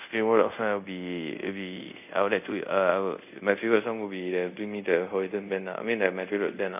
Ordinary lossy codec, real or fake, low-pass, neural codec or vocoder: none; fake; 3.6 kHz; codec, 24 kHz, 0.5 kbps, DualCodec